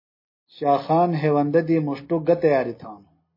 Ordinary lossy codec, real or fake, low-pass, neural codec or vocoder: MP3, 24 kbps; real; 5.4 kHz; none